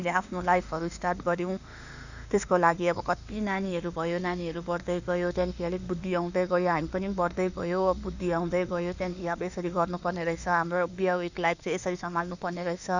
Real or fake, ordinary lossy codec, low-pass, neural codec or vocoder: fake; none; 7.2 kHz; autoencoder, 48 kHz, 32 numbers a frame, DAC-VAE, trained on Japanese speech